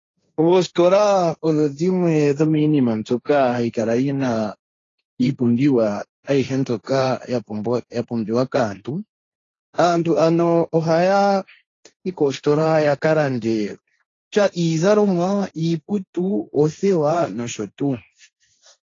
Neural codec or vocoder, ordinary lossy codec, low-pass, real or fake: codec, 16 kHz, 1.1 kbps, Voila-Tokenizer; AAC, 32 kbps; 7.2 kHz; fake